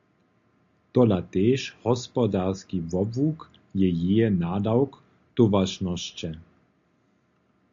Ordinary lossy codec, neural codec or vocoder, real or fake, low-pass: AAC, 64 kbps; none; real; 7.2 kHz